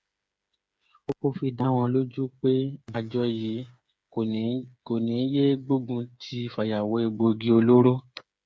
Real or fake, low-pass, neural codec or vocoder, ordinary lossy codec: fake; none; codec, 16 kHz, 8 kbps, FreqCodec, smaller model; none